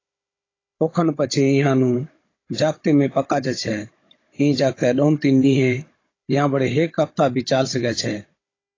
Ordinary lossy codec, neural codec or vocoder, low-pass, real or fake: AAC, 32 kbps; codec, 16 kHz, 16 kbps, FunCodec, trained on Chinese and English, 50 frames a second; 7.2 kHz; fake